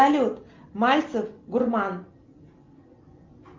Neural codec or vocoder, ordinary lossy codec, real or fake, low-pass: none; Opus, 32 kbps; real; 7.2 kHz